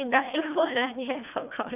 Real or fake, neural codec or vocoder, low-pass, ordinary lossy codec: fake; codec, 24 kHz, 1.5 kbps, HILCodec; 3.6 kHz; none